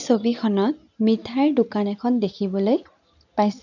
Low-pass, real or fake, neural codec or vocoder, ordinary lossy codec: 7.2 kHz; real; none; none